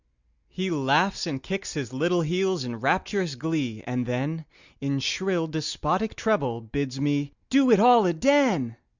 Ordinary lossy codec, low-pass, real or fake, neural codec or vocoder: Opus, 64 kbps; 7.2 kHz; real; none